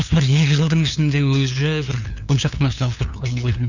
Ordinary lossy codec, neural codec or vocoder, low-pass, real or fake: none; codec, 16 kHz, 2 kbps, FunCodec, trained on LibriTTS, 25 frames a second; 7.2 kHz; fake